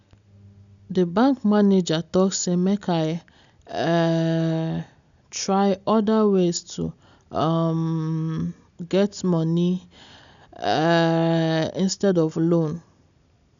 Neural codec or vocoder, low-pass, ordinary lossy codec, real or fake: none; 7.2 kHz; none; real